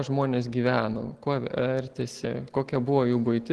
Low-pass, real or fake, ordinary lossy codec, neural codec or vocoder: 10.8 kHz; real; Opus, 16 kbps; none